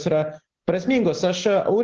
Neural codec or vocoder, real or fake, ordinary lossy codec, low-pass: none; real; Opus, 16 kbps; 7.2 kHz